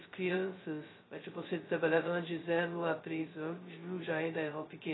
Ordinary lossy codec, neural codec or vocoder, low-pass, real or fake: AAC, 16 kbps; codec, 16 kHz, 0.2 kbps, FocalCodec; 7.2 kHz; fake